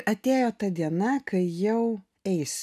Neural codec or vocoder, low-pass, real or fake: none; 14.4 kHz; real